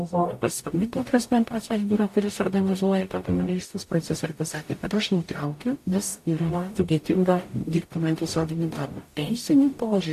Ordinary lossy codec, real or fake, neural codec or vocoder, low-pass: AAC, 64 kbps; fake; codec, 44.1 kHz, 0.9 kbps, DAC; 14.4 kHz